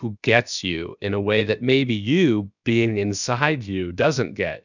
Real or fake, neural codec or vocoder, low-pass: fake; codec, 16 kHz, about 1 kbps, DyCAST, with the encoder's durations; 7.2 kHz